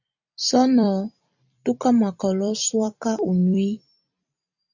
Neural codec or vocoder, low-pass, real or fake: vocoder, 44.1 kHz, 128 mel bands every 256 samples, BigVGAN v2; 7.2 kHz; fake